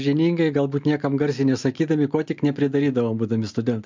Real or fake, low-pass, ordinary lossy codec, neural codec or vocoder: real; 7.2 kHz; MP3, 64 kbps; none